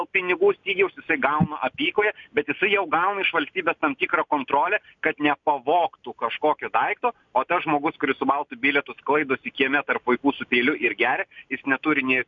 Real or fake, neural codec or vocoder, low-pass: real; none; 7.2 kHz